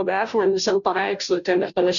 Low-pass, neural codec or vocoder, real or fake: 7.2 kHz; codec, 16 kHz, 0.5 kbps, FunCodec, trained on Chinese and English, 25 frames a second; fake